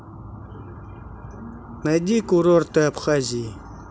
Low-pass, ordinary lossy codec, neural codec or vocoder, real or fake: none; none; none; real